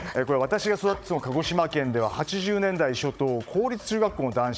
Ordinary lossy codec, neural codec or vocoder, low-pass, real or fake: none; codec, 16 kHz, 16 kbps, FunCodec, trained on Chinese and English, 50 frames a second; none; fake